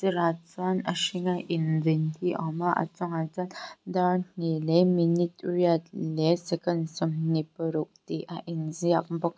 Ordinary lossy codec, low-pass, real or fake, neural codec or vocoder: none; none; real; none